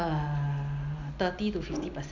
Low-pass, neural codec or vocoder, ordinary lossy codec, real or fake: 7.2 kHz; none; none; real